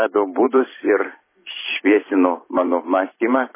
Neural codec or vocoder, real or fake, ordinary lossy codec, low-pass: vocoder, 44.1 kHz, 128 mel bands every 512 samples, BigVGAN v2; fake; MP3, 16 kbps; 3.6 kHz